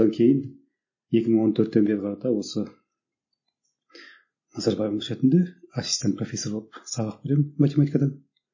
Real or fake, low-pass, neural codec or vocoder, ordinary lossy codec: real; 7.2 kHz; none; MP3, 32 kbps